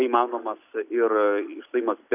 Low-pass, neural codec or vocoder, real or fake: 3.6 kHz; none; real